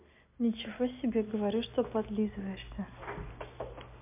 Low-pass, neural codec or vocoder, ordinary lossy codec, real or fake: 3.6 kHz; none; MP3, 32 kbps; real